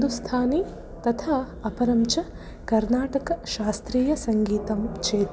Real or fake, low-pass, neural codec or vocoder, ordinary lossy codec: real; none; none; none